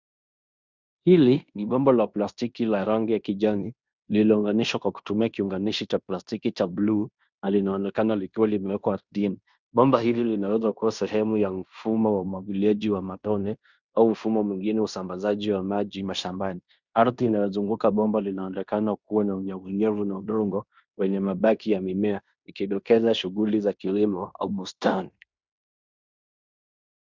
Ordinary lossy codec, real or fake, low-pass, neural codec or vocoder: Opus, 64 kbps; fake; 7.2 kHz; codec, 16 kHz in and 24 kHz out, 0.9 kbps, LongCat-Audio-Codec, fine tuned four codebook decoder